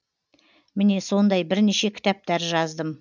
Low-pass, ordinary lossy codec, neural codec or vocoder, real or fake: 7.2 kHz; none; none; real